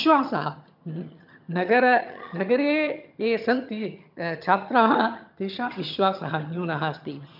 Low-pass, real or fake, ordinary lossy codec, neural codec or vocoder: 5.4 kHz; fake; none; vocoder, 22.05 kHz, 80 mel bands, HiFi-GAN